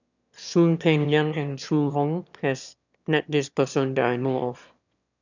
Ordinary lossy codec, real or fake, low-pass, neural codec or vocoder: none; fake; 7.2 kHz; autoencoder, 22.05 kHz, a latent of 192 numbers a frame, VITS, trained on one speaker